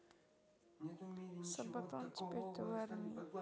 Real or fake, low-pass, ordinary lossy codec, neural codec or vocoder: real; none; none; none